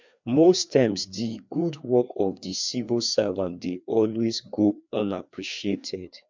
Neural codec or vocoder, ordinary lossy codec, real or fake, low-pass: codec, 16 kHz, 2 kbps, FreqCodec, larger model; MP3, 64 kbps; fake; 7.2 kHz